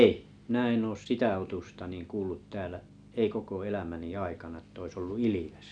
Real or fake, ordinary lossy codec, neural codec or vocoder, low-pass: real; none; none; 9.9 kHz